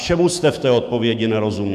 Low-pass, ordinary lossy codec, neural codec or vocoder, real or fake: 14.4 kHz; MP3, 96 kbps; autoencoder, 48 kHz, 128 numbers a frame, DAC-VAE, trained on Japanese speech; fake